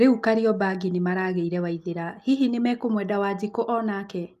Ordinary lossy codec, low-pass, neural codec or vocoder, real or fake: Opus, 32 kbps; 14.4 kHz; none; real